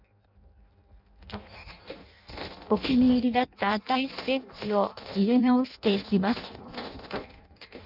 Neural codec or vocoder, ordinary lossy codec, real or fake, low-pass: codec, 16 kHz in and 24 kHz out, 0.6 kbps, FireRedTTS-2 codec; none; fake; 5.4 kHz